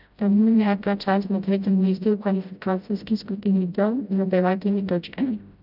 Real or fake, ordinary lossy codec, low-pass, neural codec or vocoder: fake; none; 5.4 kHz; codec, 16 kHz, 0.5 kbps, FreqCodec, smaller model